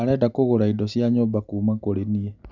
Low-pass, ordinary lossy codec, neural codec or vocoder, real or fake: 7.2 kHz; none; none; real